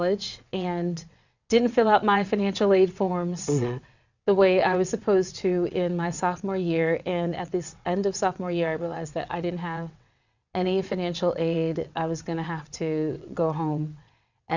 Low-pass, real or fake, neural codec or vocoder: 7.2 kHz; fake; vocoder, 22.05 kHz, 80 mel bands, WaveNeXt